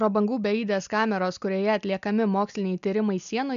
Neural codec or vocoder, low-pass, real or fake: none; 7.2 kHz; real